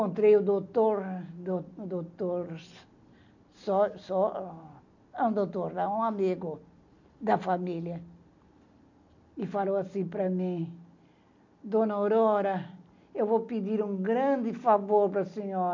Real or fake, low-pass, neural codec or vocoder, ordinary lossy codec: real; 7.2 kHz; none; none